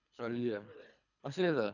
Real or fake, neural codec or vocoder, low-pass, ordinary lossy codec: fake; codec, 24 kHz, 3 kbps, HILCodec; 7.2 kHz; none